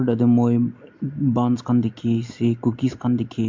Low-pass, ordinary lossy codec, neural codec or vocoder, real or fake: 7.2 kHz; MP3, 48 kbps; none; real